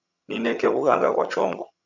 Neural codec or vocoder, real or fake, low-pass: vocoder, 22.05 kHz, 80 mel bands, HiFi-GAN; fake; 7.2 kHz